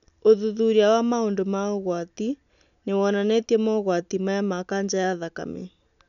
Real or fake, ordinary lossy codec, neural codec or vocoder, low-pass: real; none; none; 7.2 kHz